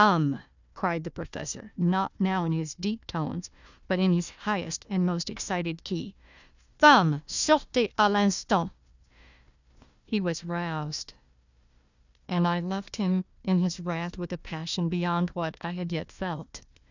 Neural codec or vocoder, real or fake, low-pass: codec, 16 kHz, 1 kbps, FunCodec, trained on Chinese and English, 50 frames a second; fake; 7.2 kHz